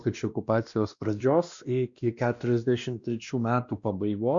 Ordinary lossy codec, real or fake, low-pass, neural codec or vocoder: Opus, 64 kbps; fake; 7.2 kHz; codec, 16 kHz, 1 kbps, X-Codec, WavLM features, trained on Multilingual LibriSpeech